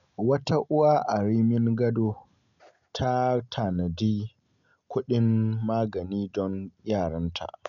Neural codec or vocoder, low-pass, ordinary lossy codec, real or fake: none; 7.2 kHz; none; real